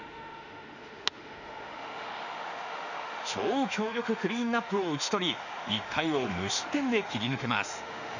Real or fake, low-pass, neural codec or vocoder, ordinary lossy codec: fake; 7.2 kHz; autoencoder, 48 kHz, 32 numbers a frame, DAC-VAE, trained on Japanese speech; none